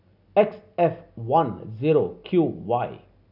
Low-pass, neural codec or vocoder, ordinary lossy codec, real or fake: 5.4 kHz; none; none; real